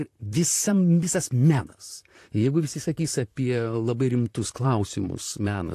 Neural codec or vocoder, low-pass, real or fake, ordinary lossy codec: vocoder, 44.1 kHz, 128 mel bands, Pupu-Vocoder; 14.4 kHz; fake; AAC, 64 kbps